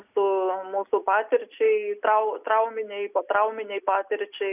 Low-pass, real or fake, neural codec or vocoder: 3.6 kHz; real; none